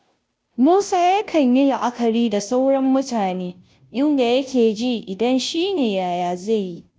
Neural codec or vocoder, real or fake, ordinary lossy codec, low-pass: codec, 16 kHz, 0.5 kbps, FunCodec, trained on Chinese and English, 25 frames a second; fake; none; none